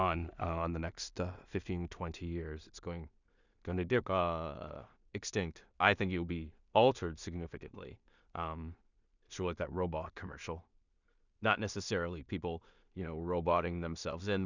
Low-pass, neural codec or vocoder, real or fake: 7.2 kHz; codec, 16 kHz in and 24 kHz out, 0.4 kbps, LongCat-Audio-Codec, two codebook decoder; fake